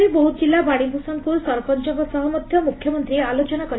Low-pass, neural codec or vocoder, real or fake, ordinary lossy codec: 7.2 kHz; none; real; AAC, 16 kbps